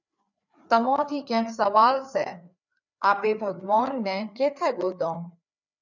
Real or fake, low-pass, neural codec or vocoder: fake; 7.2 kHz; codec, 16 kHz, 4 kbps, FreqCodec, larger model